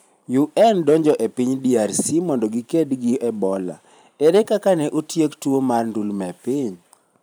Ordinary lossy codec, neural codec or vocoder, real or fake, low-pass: none; vocoder, 44.1 kHz, 128 mel bands every 512 samples, BigVGAN v2; fake; none